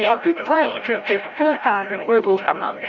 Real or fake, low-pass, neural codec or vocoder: fake; 7.2 kHz; codec, 16 kHz, 0.5 kbps, FreqCodec, larger model